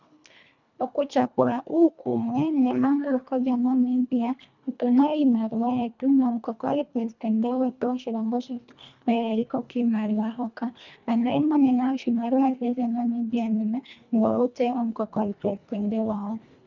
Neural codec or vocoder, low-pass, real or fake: codec, 24 kHz, 1.5 kbps, HILCodec; 7.2 kHz; fake